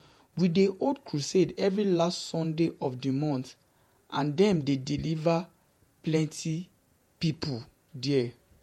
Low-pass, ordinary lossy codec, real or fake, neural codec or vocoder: 19.8 kHz; MP3, 64 kbps; fake; vocoder, 48 kHz, 128 mel bands, Vocos